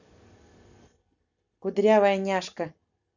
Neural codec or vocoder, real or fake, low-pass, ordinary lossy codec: none; real; 7.2 kHz; none